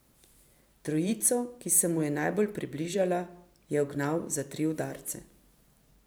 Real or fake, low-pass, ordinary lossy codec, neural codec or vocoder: fake; none; none; vocoder, 44.1 kHz, 128 mel bands every 512 samples, BigVGAN v2